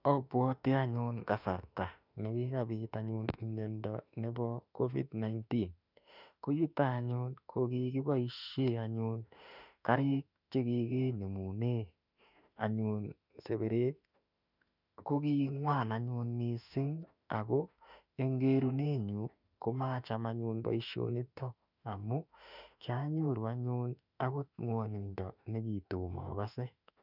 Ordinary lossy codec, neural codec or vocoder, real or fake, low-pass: none; autoencoder, 48 kHz, 32 numbers a frame, DAC-VAE, trained on Japanese speech; fake; 5.4 kHz